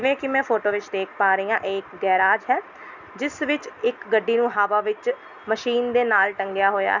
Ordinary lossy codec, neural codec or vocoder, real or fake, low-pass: none; none; real; 7.2 kHz